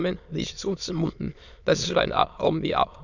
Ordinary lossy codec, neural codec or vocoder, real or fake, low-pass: none; autoencoder, 22.05 kHz, a latent of 192 numbers a frame, VITS, trained on many speakers; fake; 7.2 kHz